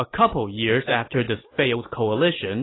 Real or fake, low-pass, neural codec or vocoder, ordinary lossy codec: real; 7.2 kHz; none; AAC, 16 kbps